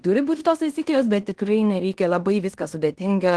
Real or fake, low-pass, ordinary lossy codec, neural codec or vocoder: fake; 10.8 kHz; Opus, 16 kbps; codec, 16 kHz in and 24 kHz out, 0.9 kbps, LongCat-Audio-Codec, fine tuned four codebook decoder